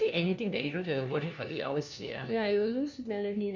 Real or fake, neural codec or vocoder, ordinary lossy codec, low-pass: fake; codec, 16 kHz, 1 kbps, FunCodec, trained on LibriTTS, 50 frames a second; none; 7.2 kHz